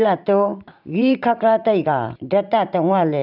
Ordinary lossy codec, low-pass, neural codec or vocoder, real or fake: none; 5.4 kHz; vocoder, 44.1 kHz, 128 mel bands every 512 samples, BigVGAN v2; fake